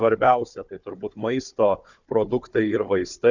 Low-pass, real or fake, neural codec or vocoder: 7.2 kHz; fake; codec, 16 kHz, 4 kbps, FunCodec, trained on Chinese and English, 50 frames a second